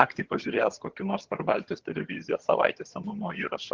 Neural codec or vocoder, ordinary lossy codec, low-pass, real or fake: vocoder, 22.05 kHz, 80 mel bands, HiFi-GAN; Opus, 16 kbps; 7.2 kHz; fake